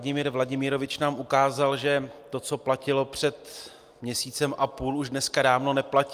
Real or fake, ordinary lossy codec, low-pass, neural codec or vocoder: real; Opus, 32 kbps; 14.4 kHz; none